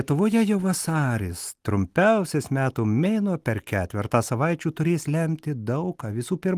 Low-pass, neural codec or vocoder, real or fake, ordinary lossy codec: 14.4 kHz; none; real; Opus, 24 kbps